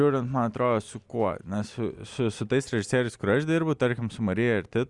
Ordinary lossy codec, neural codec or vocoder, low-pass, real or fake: Opus, 64 kbps; none; 10.8 kHz; real